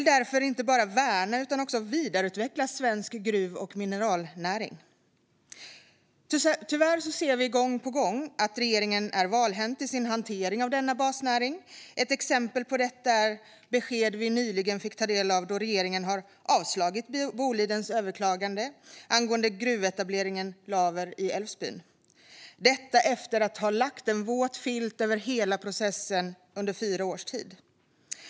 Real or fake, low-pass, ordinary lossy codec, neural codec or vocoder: real; none; none; none